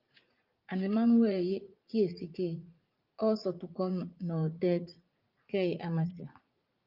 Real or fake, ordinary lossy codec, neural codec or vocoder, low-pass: fake; Opus, 24 kbps; vocoder, 44.1 kHz, 80 mel bands, Vocos; 5.4 kHz